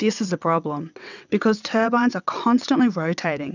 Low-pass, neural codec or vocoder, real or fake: 7.2 kHz; vocoder, 22.05 kHz, 80 mel bands, WaveNeXt; fake